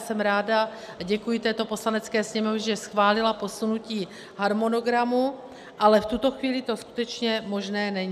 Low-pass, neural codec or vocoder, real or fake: 14.4 kHz; none; real